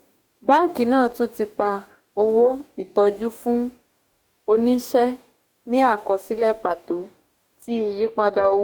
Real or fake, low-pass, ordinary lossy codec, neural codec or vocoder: fake; 19.8 kHz; Opus, 64 kbps; codec, 44.1 kHz, 2.6 kbps, DAC